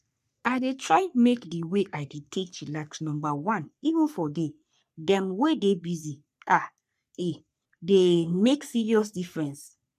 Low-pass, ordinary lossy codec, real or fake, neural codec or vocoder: 14.4 kHz; none; fake; codec, 44.1 kHz, 3.4 kbps, Pupu-Codec